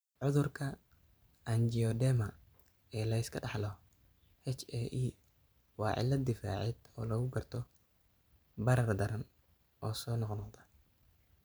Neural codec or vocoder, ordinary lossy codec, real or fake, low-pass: vocoder, 44.1 kHz, 128 mel bands every 512 samples, BigVGAN v2; none; fake; none